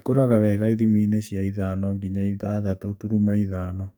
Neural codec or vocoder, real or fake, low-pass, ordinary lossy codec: codec, 44.1 kHz, 2.6 kbps, SNAC; fake; none; none